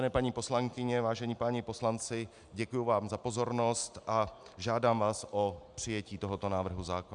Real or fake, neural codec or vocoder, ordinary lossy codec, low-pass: real; none; MP3, 96 kbps; 9.9 kHz